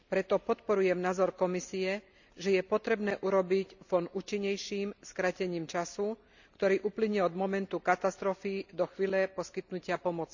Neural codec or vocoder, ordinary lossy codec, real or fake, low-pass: none; none; real; 7.2 kHz